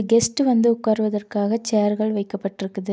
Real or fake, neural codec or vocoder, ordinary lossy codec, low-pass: real; none; none; none